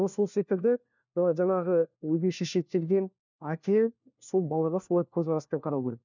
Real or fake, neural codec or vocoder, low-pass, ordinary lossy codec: fake; codec, 16 kHz, 1 kbps, FunCodec, trained on LibriTTS, 50 frames a second; 7.2 kHz; none